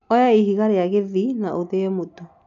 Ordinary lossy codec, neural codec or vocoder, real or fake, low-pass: none; none; real; 7.2 kHz